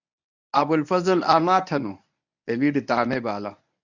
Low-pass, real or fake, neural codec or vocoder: 7.2 kHz; fake; codec, 24 kHz, 0.9 kbps, WavTokenizer, medium speech release version 1